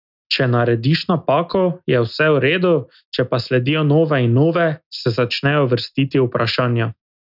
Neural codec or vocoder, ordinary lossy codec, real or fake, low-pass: none; none; real; 5.4 kHz